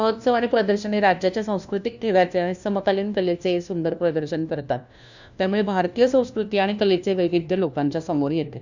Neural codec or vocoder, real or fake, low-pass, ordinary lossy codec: codec, 16 kHz, 1 kbps, FunCodec, trained on LibriTTS, 50 frames a second; fake; 7.2 kHz; none